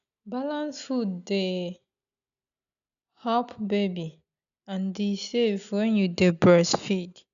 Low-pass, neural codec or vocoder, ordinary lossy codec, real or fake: 7.2 kHz; none; none; real